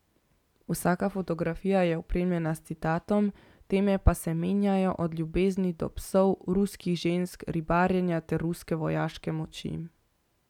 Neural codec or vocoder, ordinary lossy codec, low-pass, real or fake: none; none; 19.8 kHz; real